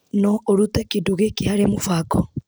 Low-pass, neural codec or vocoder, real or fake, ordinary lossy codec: none; none; real; none